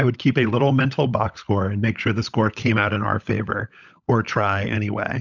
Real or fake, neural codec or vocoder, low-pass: fake; codec, 16 kHz, 16 kbps, FunCodec, trained on LibriTTS, 50 frames a second; 7.2 kHz